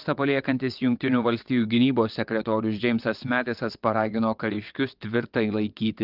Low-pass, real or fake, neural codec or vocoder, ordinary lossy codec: 5.4 kHz; fake; vocoder, 22.05 kHz, 80 mel bands, WaveNeXt; Opus, 32 kbps